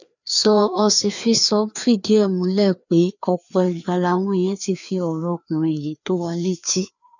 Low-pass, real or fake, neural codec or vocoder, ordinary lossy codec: 7.2 kHz; fake; codec, 16 kHz, 2 kbps, FreqCodec, larger model; none